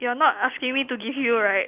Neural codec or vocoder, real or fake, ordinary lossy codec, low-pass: none; real; Opus, 24 kbps; 3.6 kHz